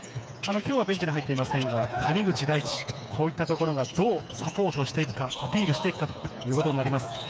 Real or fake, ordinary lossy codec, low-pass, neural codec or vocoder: fake; none; none; codec, 16 kHz, 4 kbps, FreqCodec, smaller model